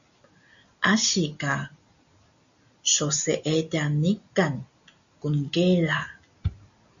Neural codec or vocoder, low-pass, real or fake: none; 7.2 kHz; real